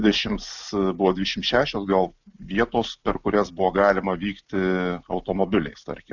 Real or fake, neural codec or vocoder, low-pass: real; none; 7.2 kHz